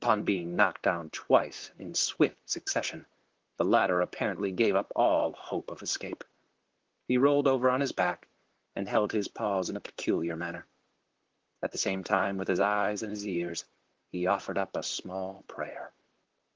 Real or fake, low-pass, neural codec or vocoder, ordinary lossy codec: fake; 7.2 kHz; vocoder, 44.1 kHz, 128 mel bands, Pupu-Vocoder; Opus, 16 kbps